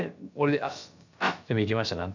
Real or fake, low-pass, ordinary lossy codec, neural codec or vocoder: fake; 7.2 kHz; none; codec, 16 kHz, about 1 kbps, DyCAST, with the encoder's durations